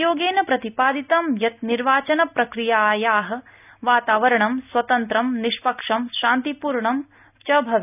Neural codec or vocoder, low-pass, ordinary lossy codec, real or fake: none; 3.6 kHz; none; real